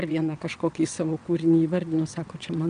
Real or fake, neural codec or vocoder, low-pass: fake; vocoder, 22.05 kHz, 80 mel bands, WaveNeXt; 9.9 kHz